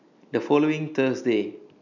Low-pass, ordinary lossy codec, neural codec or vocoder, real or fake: 7.2 kHz; none; none; real